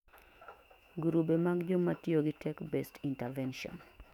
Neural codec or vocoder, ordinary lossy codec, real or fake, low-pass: autoencoder, 48 kHz, 128 numbers a frame, DAC-VAE, trained on Japanese speech; none; fake; 19.8 kHz